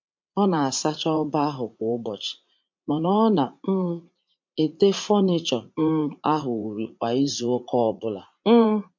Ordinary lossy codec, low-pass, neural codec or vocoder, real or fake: MP3, 48 kbps; 7.2 kHz; vocoder, 44.1 kHz, 80 mel bands, Vocos; fake